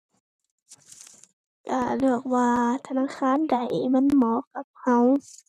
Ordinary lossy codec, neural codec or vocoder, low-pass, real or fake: none; vocoder, 44.1 kHz, 128 mel bands, Pupu-Vocoder; 14.4 kHz; fake